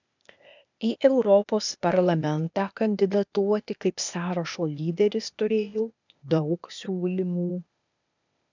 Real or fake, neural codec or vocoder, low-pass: fake; codec, 16 kHz, 0.8 kbps, ZipCodec; 7.2 kHz